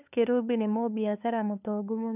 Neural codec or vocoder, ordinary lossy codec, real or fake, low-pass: codec, 16 kHz, 2 kbps, FunCodec, trained on LibriTTS, 25 frames a second; none; fake; 3.6 kHz